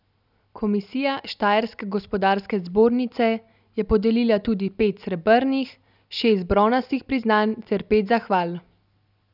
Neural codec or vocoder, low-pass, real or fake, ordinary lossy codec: none; 5.4 kHz; real; none